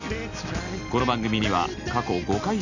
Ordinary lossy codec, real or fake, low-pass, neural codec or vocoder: none; real; 7.2 kHz; none